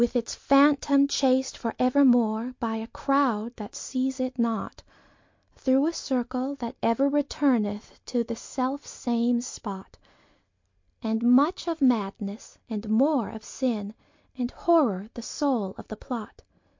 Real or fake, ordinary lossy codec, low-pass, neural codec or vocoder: real; MP3, 48 kbps; 7.2 kHz; none